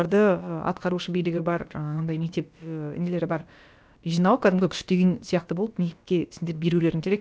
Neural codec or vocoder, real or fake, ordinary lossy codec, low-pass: codec, 16 kHz, about 1 kbps, DyCAST, with the encoder's durations; fake; none; none